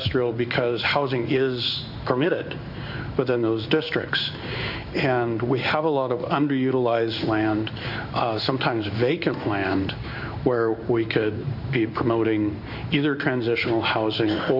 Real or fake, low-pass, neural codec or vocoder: fake; 5.4 kHz; codec, 16 kHz in and 24 kHz out, 1 kbps, XY-Tokenizer